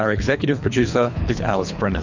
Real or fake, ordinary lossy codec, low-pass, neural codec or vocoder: fake; MP3, 64 kbps; 7.2 kHz; codec, 24 kHz, 3 kbps, HILCodec